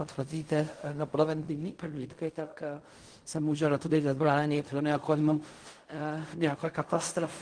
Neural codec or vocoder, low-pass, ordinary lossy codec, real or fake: codec, 16 kHz in and 24 kHz out, 0.4 kbps, LongCat-Audio-Codec, fine tuned four codebook decoder; 9.9 kHz; Opus, 24 kbps; fake